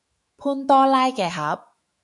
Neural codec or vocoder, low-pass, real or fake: autoencoder, 48 kHz, 128 numbers a frame, DAC-VAE, trained on Japanese speech; 10.8 kHz; fake